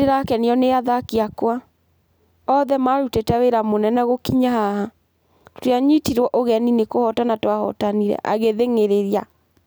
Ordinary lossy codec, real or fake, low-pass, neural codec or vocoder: none; real; none; none